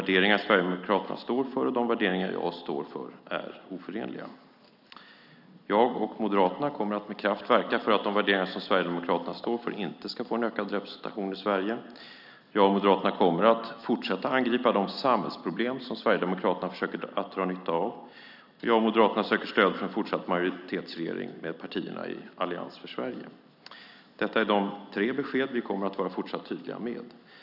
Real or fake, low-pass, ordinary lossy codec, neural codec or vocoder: real; 5.4 kHz; none; none